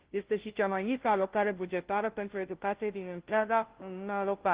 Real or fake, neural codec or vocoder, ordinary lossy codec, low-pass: fake; codec, 16 kHz, 0.5 kbps, FunCodec, trained on Chinese and English, 25 frames a second; Opus, 16 kbps; 3.6 kHz